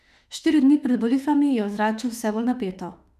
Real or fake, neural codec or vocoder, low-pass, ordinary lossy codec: fake; autoencoder, 48 kHz, 32 numbers a frame, DAC-VAE, trained on Japanese speech; 14.4 kHz; none